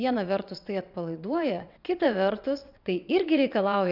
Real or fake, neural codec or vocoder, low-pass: fake; vocoder, 22.05 kHz, 80 mel bands, WaveNeXt; 5.4 kHz